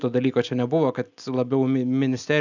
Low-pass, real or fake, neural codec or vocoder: 7.2 kHz; real; none